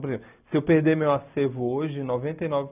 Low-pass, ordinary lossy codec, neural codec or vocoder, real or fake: 3.6 kHz; none; none; real